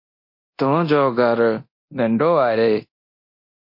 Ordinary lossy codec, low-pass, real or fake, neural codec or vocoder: MP3, 32 kbps; 5.4 kHz; fake; codec, 24 kHz, 0.9 kbps, DualCodec